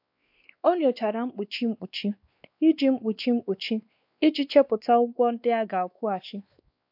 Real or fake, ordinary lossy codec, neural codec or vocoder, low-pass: fake; AAC, 48 kbps; codec, 16 kHz, 2 kbps, X-Codec, WavLM features, trained on Multilingual LibriSpeech; 5.4 kHz